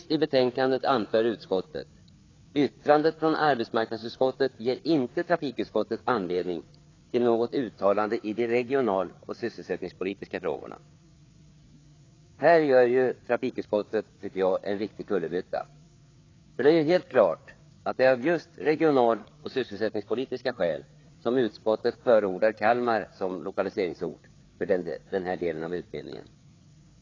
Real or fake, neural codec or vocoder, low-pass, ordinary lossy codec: fake; codec, 16 kHz, 4 kbps, FreqCodec, larger model; 7.2 kHz; AAC, 32 kbps